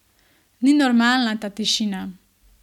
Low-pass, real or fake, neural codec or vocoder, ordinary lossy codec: 19.8 kHz; real; none; none